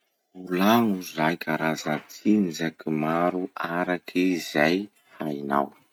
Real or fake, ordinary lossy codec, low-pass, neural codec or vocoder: real; none; 19.8 kHz; none